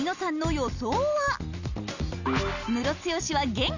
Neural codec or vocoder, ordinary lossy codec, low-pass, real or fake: none; none; 7.2 kHz; real